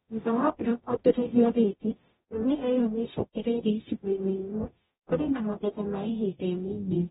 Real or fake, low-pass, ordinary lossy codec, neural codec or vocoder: fake; 19.8 kHz; AAC, 16 kbps; codec, 44.1 kHz, 0.9 kbps, DAC